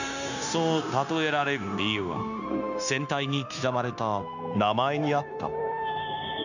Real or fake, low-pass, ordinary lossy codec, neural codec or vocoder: fake; 7.2 kHz; none; codec, 16 kHz, 0.9 kbps, LongCat-Audio-Codec